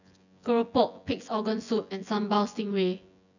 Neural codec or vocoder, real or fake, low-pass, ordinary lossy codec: vocoder, 24 kHz, 100 mel bands, Vocos; fake; 7.2 kHz; none